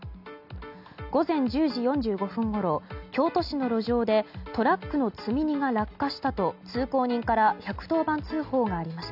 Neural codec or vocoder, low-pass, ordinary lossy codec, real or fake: none; 5.4 kHz; none; real